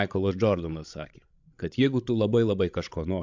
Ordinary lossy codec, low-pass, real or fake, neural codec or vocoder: MP3, 64 kbps; 7.2 kHz; fake; codec, 16 kHz, 16 kbps, FreqCodec, larger model